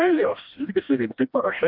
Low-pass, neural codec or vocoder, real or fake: 5.4 kHz; codec, 16 kHz, 1 kbps, FreqCodec, smaller model; fake